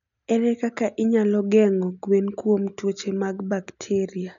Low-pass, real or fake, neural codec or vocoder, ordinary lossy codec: 7.2 kHz; real; none; none